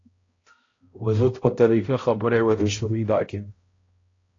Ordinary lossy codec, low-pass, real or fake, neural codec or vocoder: AAC, 32 kbps; 7.2 kHz; fake; codec, 16 kHz, 0.5 kbps, X-Codec, HuBERT features, trained on balanced general audio